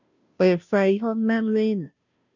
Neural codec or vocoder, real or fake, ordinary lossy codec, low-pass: codec, 16 kHz, 0.5 kbps, FunCodec, trained on Chinese and English, 25 frames a second; fake; none; 7.2 kHz